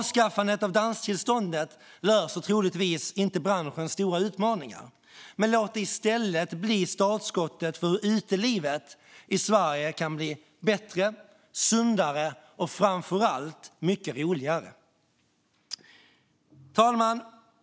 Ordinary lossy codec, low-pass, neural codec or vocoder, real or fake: none; none; none; real